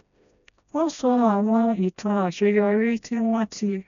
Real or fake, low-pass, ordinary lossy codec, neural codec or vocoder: fake; 7.2 kHz; none; codec, 16 kHz, 1 kbps, FreqCodec, smaller model